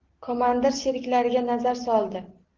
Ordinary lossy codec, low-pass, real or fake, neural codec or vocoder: Opus, 16 kbps; 7.2 kHz; real; none